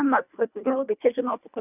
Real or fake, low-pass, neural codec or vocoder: fake; 3.6 kHz; codec, 24 kHz, 1.5 kbps, HILCodec